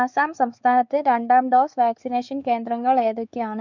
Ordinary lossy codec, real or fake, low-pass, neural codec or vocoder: none; fake; 7.2 kHz; codec, 16 kHz, 4 kbps, X-Codec, WavLM features, trained on Multilingual LibriSpeech